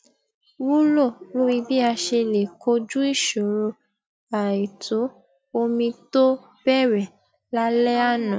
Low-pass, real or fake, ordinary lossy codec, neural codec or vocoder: none; real; none; none